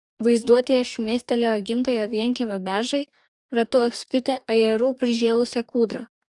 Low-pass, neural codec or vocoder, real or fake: 10.8 kHz; codec, 44.1 kHz, 2.6 kbps, DAC; fake